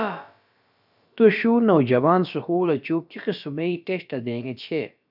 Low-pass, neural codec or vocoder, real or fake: 5.4 kHz; codec, 16 kHz, about 1 kbps, DyCAST, with the encoder's durations; fake